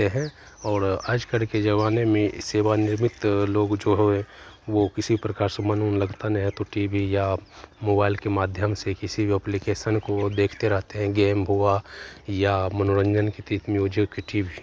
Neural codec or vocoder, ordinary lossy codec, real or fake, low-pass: none; none; real; none